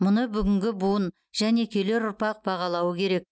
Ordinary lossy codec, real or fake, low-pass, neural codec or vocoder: none; real; none; none